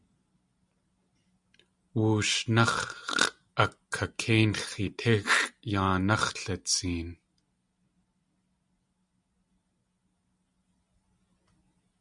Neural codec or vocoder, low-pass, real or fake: none; 10.8 kHz; real